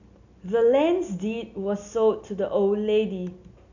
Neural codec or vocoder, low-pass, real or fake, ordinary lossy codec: none; 7.2 kHz; real; none